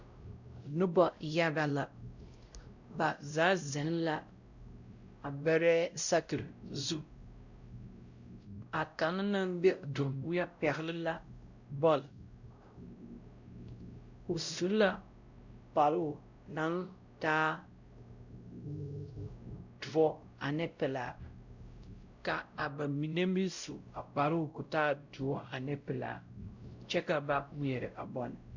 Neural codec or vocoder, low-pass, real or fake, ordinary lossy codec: codec, 16 kHz, 0.5 kbps, X-Codec, WavLM features, trained on Multilingual LibriSpeech; 7.2 kHz; fake; Opus, 64 kbps